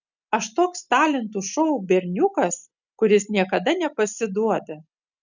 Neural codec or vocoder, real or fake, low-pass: none; real; 7.2 kHz